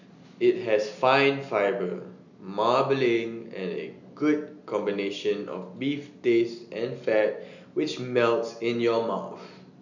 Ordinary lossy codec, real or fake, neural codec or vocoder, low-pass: none; real; none; 7.2 kHz